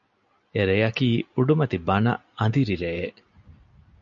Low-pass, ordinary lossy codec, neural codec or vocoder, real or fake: 7.2 kHz; MP3, 96 kbps; none; real